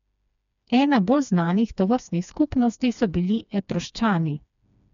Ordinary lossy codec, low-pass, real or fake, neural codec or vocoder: none; 7.2 kHz; fake; codec, 16 kHz, 2 kbps, FreqCodec, smaller model